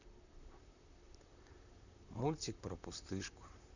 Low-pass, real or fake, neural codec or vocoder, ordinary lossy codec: 7.2 kHz; fake; vocoder, 44.1 kHz, 128 mel bands, Pupu-Vocoder; none